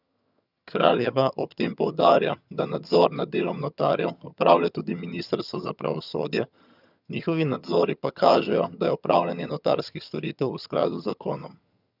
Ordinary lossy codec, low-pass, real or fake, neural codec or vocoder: none; 5.4 kHz; fake; vocoder, 22.05 kHz, 80 mel bands, HiFi-GAN